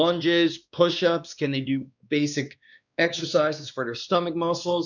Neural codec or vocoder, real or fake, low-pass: codec, 16 kHz, 2 kbps, X-Codec, WavLM features, trained on Multilingual LibriSpeech; fake; 7.2 kHz